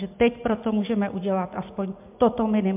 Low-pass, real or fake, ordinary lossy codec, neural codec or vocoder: 3.6 kHz; real; MP3, 32 kbps; none